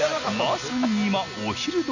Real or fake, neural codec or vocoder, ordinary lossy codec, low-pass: real; none; none; 7.2 kHz